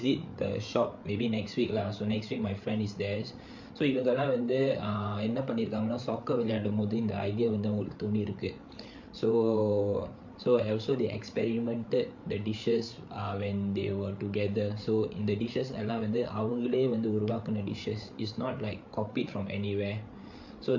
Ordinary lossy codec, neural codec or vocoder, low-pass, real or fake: MP3, 48 kbps; codec, 16 kHz, 8 kbps, FreqCodec, larger model; 7.2 kHz; fake